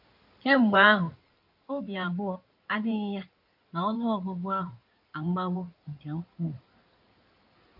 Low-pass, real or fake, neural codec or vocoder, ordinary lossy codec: 5.4 kHz; fake; codec, 16 kHz in and 24 kHz out, 2.2 kbps, FireRedTTS-2 codec; AAC, 48 kbps